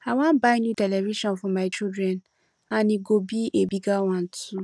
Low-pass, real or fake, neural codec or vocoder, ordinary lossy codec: none; real; none; none